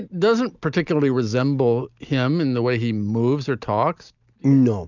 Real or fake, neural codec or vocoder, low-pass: real; none; 7.2 kHz